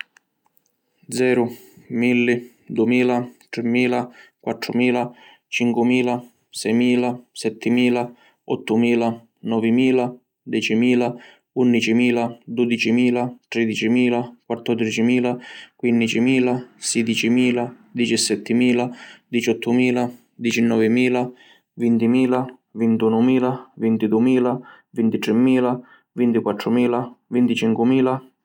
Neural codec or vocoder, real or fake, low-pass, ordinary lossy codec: none; real; 19.8 kHz; none